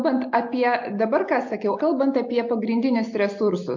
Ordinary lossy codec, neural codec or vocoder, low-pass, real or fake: MP3, 48 kbps; none; 7.2 kHz; real